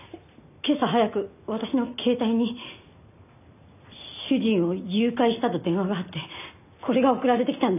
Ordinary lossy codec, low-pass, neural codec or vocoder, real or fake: none; 3.6 kHz; none; real